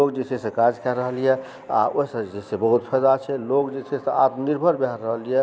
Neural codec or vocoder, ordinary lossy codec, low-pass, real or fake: none; none; none; real